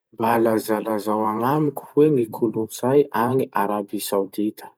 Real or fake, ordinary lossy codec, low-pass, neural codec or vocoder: fake; none; none; vocoder, 44.1 kHz, 128 mel bands every 512 samples, BigVGAN v2